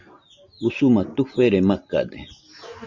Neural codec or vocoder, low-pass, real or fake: none; 7.2 kHz; real